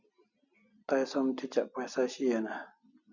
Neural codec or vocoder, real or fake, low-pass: none; real; 7.2 kHz